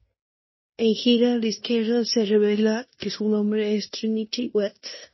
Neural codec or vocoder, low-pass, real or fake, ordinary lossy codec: codec, 16 kHz in and 24 kHz out, 0.9 kbps, LongCat-Audio-Codec, fine tuned four codebook decoder; 7.2 kHz; fake; MP3, 24 kbps